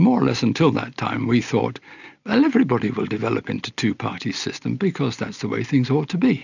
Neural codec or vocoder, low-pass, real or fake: none; 7.2 kHz; real